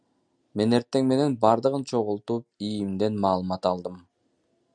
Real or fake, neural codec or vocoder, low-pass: real; none; 9.9 kHz